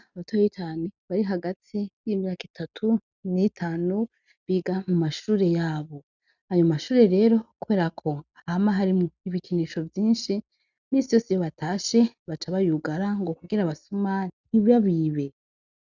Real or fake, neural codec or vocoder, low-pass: real; none; 7.2 kHz